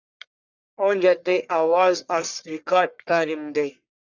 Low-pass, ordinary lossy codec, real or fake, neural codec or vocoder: 7.2 kHz; Opus, 64 kbps; fake; codec, 44.1 kHz, 1.7 kbps, Pupu-Codec